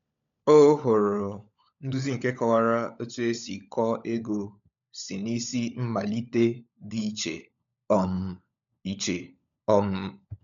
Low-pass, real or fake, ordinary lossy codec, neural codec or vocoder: 7.2 kHz; fake; MP3, 64 kbps; codec, 16 kHz, 16 kbps, FunCodec, trained on LibriTTS, 50 frames a second